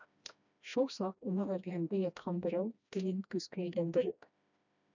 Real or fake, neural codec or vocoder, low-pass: fake; codec, 16 kHz, 1 kbps, FreqCodec, smaller model; 7.2 kHz